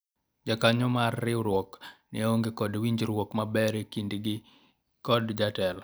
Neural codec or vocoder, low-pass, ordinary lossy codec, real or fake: none; none; none; real